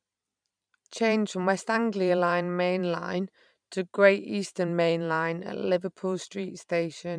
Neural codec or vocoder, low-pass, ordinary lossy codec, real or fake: vocoder, 44.1 kHz, 128 mel bands every 512 samples, BigVGAN v2; 9.9 kHz; none; fake